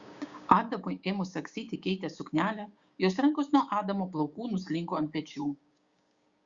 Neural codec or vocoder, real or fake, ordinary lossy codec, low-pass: codec, 16 kHz, 6 kbps, DAC; fake; Opus, 64 kbps; 7.2 kHz